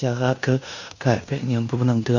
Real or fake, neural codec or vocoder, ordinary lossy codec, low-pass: fake; codec, 16 kHz in and 24 kHz out, 0.9 kbps, LongCat-Audio-Codec, fine tuned four codebook decoder; none; 7.2 kHz